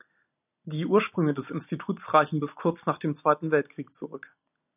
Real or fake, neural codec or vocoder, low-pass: real; none; 3.6 kHz